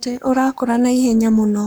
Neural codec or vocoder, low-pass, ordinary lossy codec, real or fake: codec, 44.1 kHz, 7.8 kbps, Pupu-Codec; none; none; fake